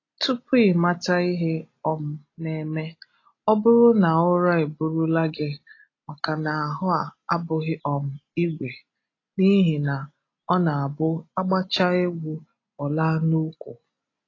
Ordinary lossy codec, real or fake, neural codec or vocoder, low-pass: AAC, 32 kbps; real; none; 7.2 kHz